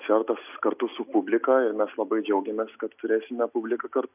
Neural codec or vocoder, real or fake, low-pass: none; real; 3.6 kHz